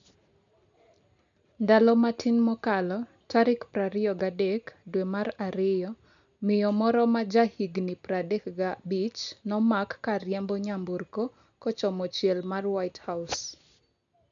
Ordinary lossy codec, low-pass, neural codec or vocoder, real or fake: none; 7.2 kHz; none; real